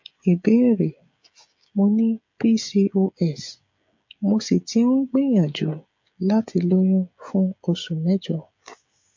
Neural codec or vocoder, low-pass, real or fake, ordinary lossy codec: codec, 16 kHz, 16 kbps, FreqCodec, smaller model; 7.2 kHz; fake; MP3, 48 kbps